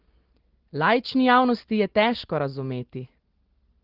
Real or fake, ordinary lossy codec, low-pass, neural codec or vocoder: real; Opus, 16 kbps; 5.4 kHz; none